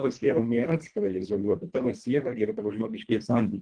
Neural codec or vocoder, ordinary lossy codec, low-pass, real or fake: codec, 24 kHz, 1.5 kbps, HILCodec; Opus, 24 kbps; 9.9 kHz; fake